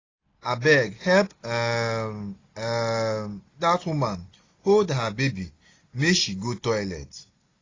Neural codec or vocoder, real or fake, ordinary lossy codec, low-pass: none; real; AAC, 32 kbps; 7.2 kHz